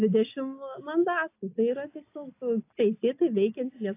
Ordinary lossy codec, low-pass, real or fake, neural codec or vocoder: AAC, 24 kbps; 3.6 kHz; real; none